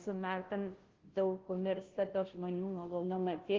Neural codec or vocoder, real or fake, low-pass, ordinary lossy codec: codec, 16 kHz, 0.5 kbps, FunCodec, trained on Chinese and English, 25 frames a second; fake; 7.2 kHz; Opus, 16 kbps